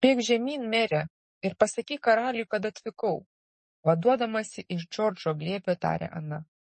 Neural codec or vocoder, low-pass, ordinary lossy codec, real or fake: codec, 44.1 kHz, 7.8 kbps, DAC; 10.8 kHz; MP3, 32 kbps; fake